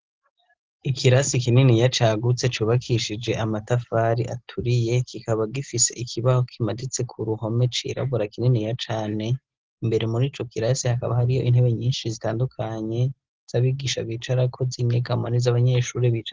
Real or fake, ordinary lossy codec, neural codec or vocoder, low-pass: real; Opus, 16 kbps; none; 7.2 kHz